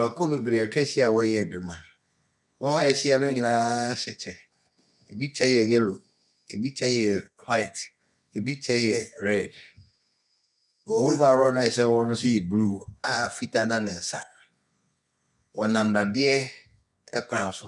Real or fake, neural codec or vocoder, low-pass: fake; codec, 24 kHz, 0.9 kbps, WavTokenizer, medium music audio release; 10.8 kHz